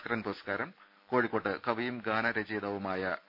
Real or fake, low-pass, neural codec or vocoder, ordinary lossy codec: real; 5.4 kHz; none; none